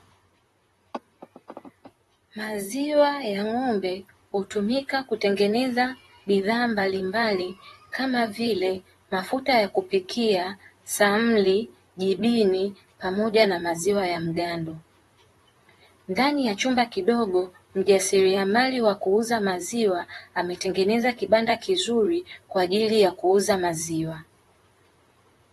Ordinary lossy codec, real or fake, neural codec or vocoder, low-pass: AAC, 32 kbps; fake; vocoder, 44.1 kHz, 128 mel bands, Pupu-Vocoder; 19.8 kHz